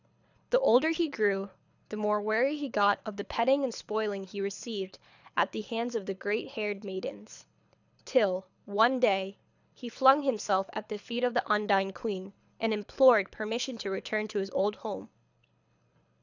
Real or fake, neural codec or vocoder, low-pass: fake; codec, 24 kHz, 6 kbps, HILCodec; 7.2 kHz